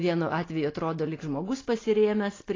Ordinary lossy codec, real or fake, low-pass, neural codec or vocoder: AAC, 32 kbps; real; 7.2 kHz; none